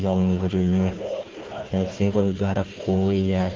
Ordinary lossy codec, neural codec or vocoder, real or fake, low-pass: Opus, 16 kbps; codec, 16 kHz, 1 kbps, FunCodec, trained on Chinese and English, 50 frames a second; fake; 7.2 kHz